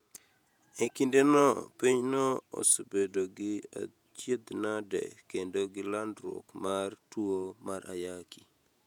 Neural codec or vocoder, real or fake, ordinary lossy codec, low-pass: vocoder, 48 kHz, 128 mel bands, Vocos; fake; none; 19.8 kHz